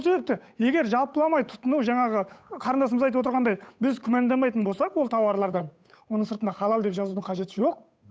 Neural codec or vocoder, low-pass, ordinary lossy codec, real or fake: codec, 16 kHz, 8 kbps, FunCodec, trained on Chinese and English, 25 frames a second; none; none; fake